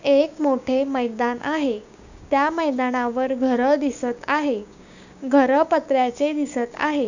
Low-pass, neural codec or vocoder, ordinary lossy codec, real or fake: 7.2 kHz; codec, 16 kHz, 6 kbps, DAC; none; fake